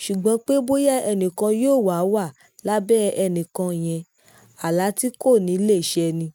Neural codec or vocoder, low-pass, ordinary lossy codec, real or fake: none; none; none; real